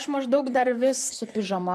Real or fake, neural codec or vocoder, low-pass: fake; vocoder, 44.1 kHz, 128 mel bands, Pupu-Vocoder; 14.4 kHz